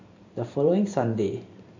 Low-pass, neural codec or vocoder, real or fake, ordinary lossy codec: 7.2 kHz; vocoder, 44.1 kHz, 128 mel bands every 512 samples, BigVGAN v2; fake; MP3, 48 kbps